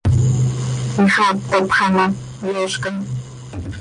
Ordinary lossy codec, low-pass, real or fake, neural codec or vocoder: MP3, 48 kbps; 9.9 kHz; real; none